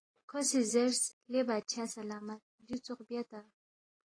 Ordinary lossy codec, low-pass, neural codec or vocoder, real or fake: AAC, 32 kbps; 9.9 kHz; none; real